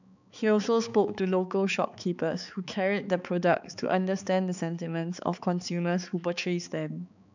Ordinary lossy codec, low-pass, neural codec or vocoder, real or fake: none; 7.2 kHz; codec, 16 kHz, 4 kbps, X-Codec, HuBERT features, trained on balanced general audio; fake